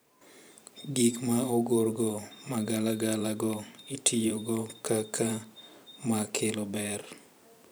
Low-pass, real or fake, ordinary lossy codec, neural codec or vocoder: none; fake; none; vocoder, 44.1 kHz, 128 mel bands every 512 samples, BigVGAN v2